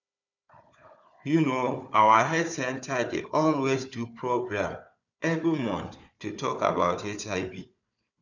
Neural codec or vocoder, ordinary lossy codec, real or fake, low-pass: codec, 16 kHz, 4 kbps, FunCodec, trained on Chinese and English, 50 frames a second; none; fake; 7.2 kHz